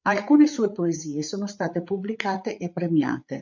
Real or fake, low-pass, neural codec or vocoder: fake; 7.2 kHz; codec, 16 kHz, 8 kbps, FreqCodec, larger model